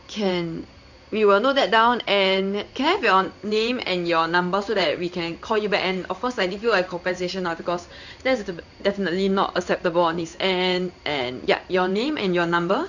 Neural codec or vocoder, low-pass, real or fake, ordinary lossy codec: codec, 16 kHz in and 24 kHz out, 1 kbps, XY-Tokenizer; 7.2 kHz; fake; none